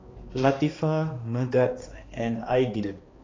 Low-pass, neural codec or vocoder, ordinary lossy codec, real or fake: 7.2 kHz; codec, 16 kHz, 2 kbps, X-Codec, HuBERT features, trained on balanced general audio; AAC, 32 kbps; fake